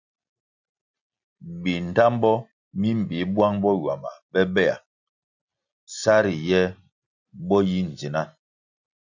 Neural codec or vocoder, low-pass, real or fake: none; 7.2 kHz; real